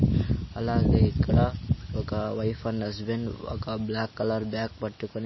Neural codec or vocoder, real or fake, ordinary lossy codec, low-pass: none; real; MP3, 24 kbps; 7.2 kHz